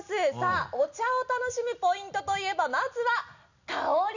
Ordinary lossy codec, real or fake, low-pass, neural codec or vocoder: none; real; 7.2 kHz; none